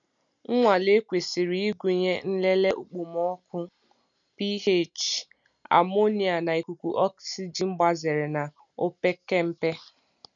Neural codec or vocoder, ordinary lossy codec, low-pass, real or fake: none; none; 7.2 kHz; real